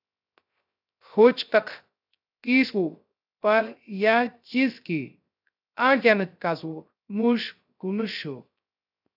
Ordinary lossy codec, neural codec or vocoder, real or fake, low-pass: AAC, 48 kbps; codec, 16 kHz, 0.3 kbps, FocalCodec; fake; 5.4 kHz